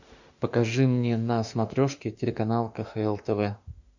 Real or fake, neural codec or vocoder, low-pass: fake; autoencoder, 48 kHz, 32 numbers a frame, DAC-VAE, trained on Japanese speech; 7.2 kHz